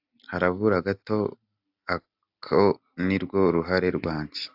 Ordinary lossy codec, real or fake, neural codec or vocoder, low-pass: AAC, 48 kbps; real; none; 5.4 kHz